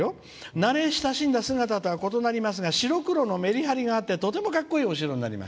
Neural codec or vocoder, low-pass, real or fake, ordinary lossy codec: none; none; real; none